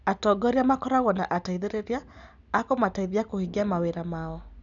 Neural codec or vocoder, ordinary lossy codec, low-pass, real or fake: none; none; 7.2 kHz; real